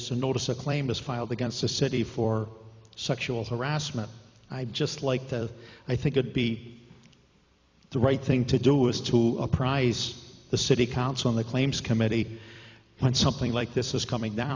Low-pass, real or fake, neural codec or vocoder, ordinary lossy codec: 7.2 kHz; fake; vocoder, 44.1 kHz, 128 mel bands every 256 samples, BigVGAN v2; MP3, 64 kbps